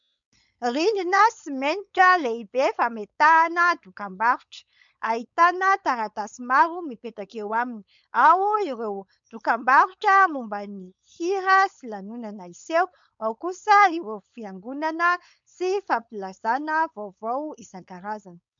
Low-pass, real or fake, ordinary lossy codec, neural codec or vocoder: 7.2 kHz; fake; MP3, 64 kbps; codec, 16 kHz, 4.8 kbps, FACodec